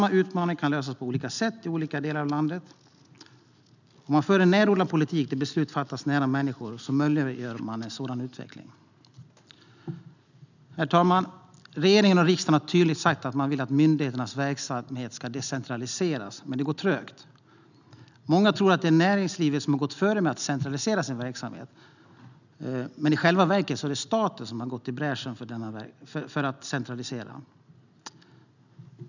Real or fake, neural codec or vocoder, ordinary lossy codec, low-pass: real; none; none; 7.2 kHz